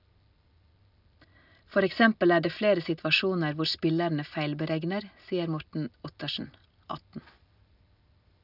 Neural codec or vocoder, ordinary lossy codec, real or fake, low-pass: none; none; real; 5.4 kHz